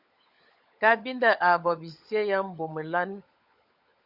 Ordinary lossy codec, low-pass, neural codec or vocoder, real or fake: Opus, 64 kbps; 5.4 kHz; codec, 16 kHz, 16 kbps, FunCodec, trained on LibriTTS, 50 frames a second; fake